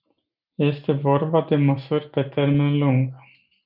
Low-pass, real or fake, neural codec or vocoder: 5.4 kHz; real; none